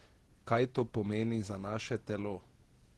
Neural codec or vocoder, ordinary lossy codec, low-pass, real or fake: vocoder, 48 kHz, 128 mel bands, Vocos; Opus, 16 kbps; 19.8 kHz; fake